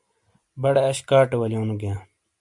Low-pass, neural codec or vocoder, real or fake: 10.8 kHz; none; real